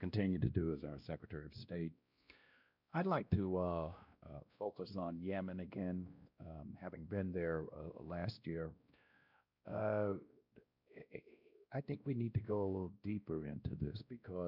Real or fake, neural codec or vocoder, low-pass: fake; codec, 16 kHz, 1 kbps, X-Codec, WavLM features, trained on Multilingual LibriSpeech; 5.4 kHz